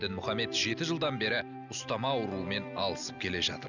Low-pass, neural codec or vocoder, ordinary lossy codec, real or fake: 7.2 kHz; none; none; real